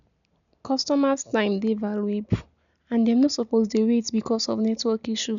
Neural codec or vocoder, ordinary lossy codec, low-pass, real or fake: none; MP3, 96 kbps; 7.2 kHz; real